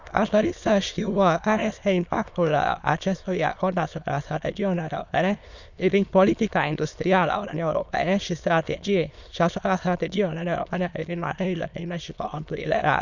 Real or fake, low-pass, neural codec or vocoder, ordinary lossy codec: fake; 7.2 kHz; autoencoder, 22.05 kHz, a latent of 192 numbers a frame, VITS, trained on many speakers; none